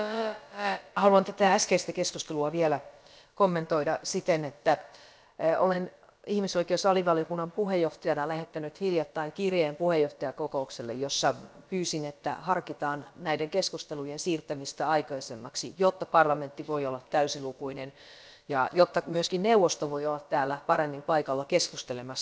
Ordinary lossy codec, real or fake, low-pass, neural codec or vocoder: none; fake; none; codec, 16 kHz, about 1 kbps, DyCAST, with the encoder's durations